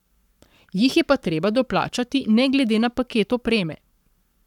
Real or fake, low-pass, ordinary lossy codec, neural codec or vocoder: fake; 19.8 kHz; none; codec, 44.1 kHz, 7.8 kbps, Pupu-Codec